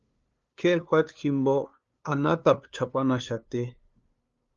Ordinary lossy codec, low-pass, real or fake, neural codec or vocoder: Opus, 32 kbps; 7.2 kHz; fake; codec, 16 kHz, 2 kbps, FunCodec, trained on LibriTTS, 25 frames a second